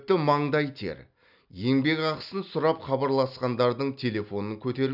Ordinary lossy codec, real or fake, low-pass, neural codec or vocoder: none; real; 5.4 kHz; none